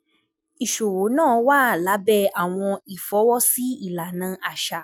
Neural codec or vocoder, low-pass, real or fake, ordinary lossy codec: none; none; real; none